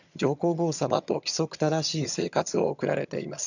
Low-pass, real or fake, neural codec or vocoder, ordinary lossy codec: 7.2 kHz; fake; vocoder, 22.05 kHz, 80 mel bands, HiFi-GAN; none